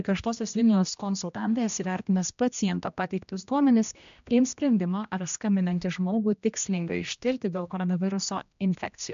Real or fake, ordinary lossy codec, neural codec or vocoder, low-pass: fake; MP3, 64 kbps; codec, 16 kHz, 1 kbps, X-Codec, HuBERT features, trained on general audio; 7.2 kHz